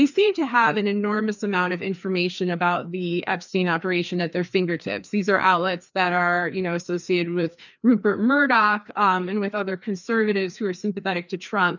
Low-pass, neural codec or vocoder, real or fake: 7.2 kHz; codec, 16 kHz, 2 kbps, FreqCodec, larger model; fake